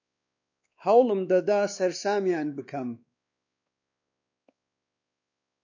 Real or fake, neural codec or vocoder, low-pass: fake; codec, 16 kHz, 2 kbps, X-Codec, WavLM features, trained on Multilingual LibriSpeech; 7.2 kHz